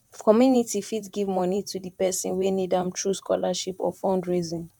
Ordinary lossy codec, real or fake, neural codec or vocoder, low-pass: none; fake; vocoder, 44.1 kHz, 128 mel bands, Pupu-Vocoder; 19.8 kHz